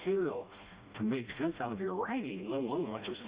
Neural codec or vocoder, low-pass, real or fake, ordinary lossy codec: codec, 16 kHz, 1 kbps, FreqCodec, smaller model; 3.6 kHz; fake; Opus, 64 kbps